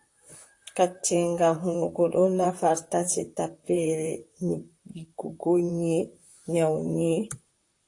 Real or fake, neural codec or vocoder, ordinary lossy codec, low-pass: fake; vocoder, 44.1 kHz, 128 mel bands, Pupu-Vocoder; AAC, 48 kbps; 10.8 kHz